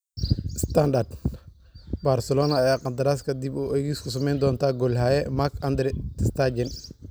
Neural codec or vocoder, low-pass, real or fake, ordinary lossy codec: none; none; real; none